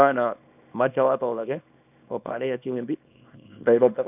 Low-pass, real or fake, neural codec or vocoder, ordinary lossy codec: 3.6 kHz; fake; codec, 24 kHz, 0.9 kbps, WavTokenizer, small release; none